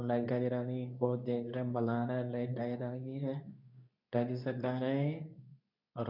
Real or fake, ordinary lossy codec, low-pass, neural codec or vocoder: fake; none; 5.4 kHz; codec, 24 kHz, 0.9 kbps, WavTokenizer, medium speech release version 2